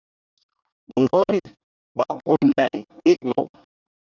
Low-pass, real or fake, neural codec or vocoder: 7.2 kHz; fake; codec, 24 kHz, 1 kbps, SNAC